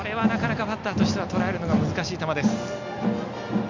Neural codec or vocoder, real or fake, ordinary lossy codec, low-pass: none; real; Opus, 64 kbps; 7.2 kHz